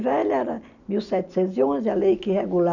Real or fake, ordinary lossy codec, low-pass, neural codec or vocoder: real; none; 7.2 kHz; none